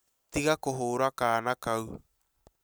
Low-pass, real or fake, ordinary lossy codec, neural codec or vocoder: none; real; none; none